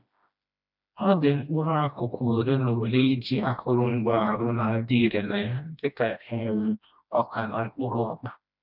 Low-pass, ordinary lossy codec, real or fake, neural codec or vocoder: 5.4 kHz; none; fake; codec, 16 kHz, 1 kbps, FreqCodec, smaller model